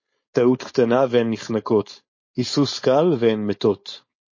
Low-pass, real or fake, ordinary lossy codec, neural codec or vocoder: 7.2 kHz; fake; MP3, 32 kbps; codec, 16 kHz, 4.8 kbps, FACodec